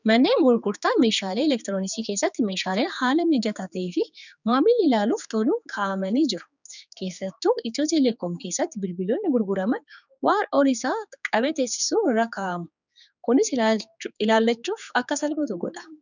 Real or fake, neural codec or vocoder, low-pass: fake; codec, 16 kHz, 4 kbps, X-Codec, HuBERT features, trained on general audio; 7.2 kHz